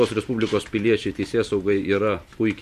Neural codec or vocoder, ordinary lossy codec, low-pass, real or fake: vocoder, 44.1 kHz, 128 mel bands every 512 samples, BigVGAN v2; MP3, 64 kbps; 14.4 kHz; fake